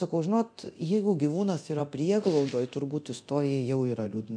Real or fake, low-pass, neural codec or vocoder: fake; 9.9 kHz; codec, 24 kHz, 0.9 kbps, DualCodec